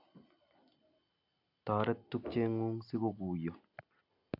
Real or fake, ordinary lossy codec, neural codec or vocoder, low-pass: real; AAC, 32 kbps; none; 5.4 kHz